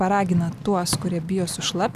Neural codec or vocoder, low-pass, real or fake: none; 14.4 kHz; real